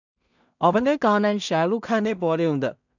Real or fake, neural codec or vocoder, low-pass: fake; codec, 16 kHz in and 24 kHz out, 0.4 kbps, LongCat-Audio-Codec, two codebook decoder; 7.2 kHz